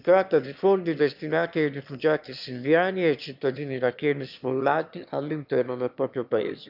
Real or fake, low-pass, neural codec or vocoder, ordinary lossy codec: fake; 5.4 kHz; autoencoder, 22.05 kHz, a latent of 192 numbers a frame, VITS, trained on one speaker; none